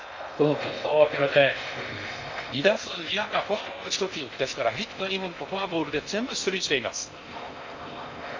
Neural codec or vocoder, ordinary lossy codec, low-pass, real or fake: codec, 16 kHz in and 24 kHz out, 0.6 kbps, FocalCodec, streaming, 2048 codes; MP3, 48 kbps; 7.2 kHz; fake